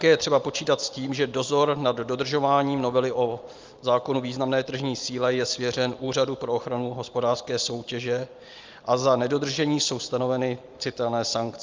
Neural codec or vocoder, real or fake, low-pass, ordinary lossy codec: none; real; 7.2 kHz; Opus, 32 kbps